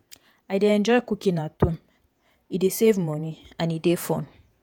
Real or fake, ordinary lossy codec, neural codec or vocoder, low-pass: fake; none; vocoder, 48 kHz, 128 mel bands, Vocos; none